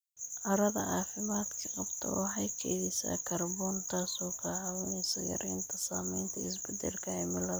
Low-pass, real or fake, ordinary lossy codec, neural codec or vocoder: none; real; none; none